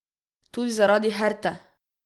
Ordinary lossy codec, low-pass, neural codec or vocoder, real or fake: Opus, 24 kbps; 19.8 kHz; none; real